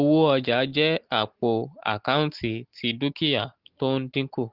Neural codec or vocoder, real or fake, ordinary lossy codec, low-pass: none; real; Opus, 16 kbps; 5.4 kHz